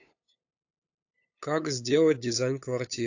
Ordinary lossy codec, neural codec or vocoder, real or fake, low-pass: AAC, 48 kbps; codec, 16 kHz, 8 kbps, FunCodec, trained on LibriTTS, 25 frames a second; fake; 7.2 kHz